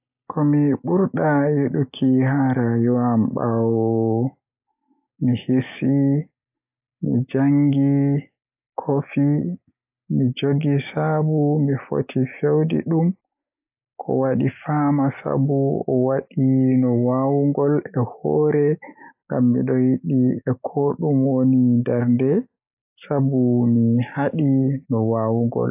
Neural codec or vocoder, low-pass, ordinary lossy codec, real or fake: none; 3.6 kHz; AAC, 32 kbps; real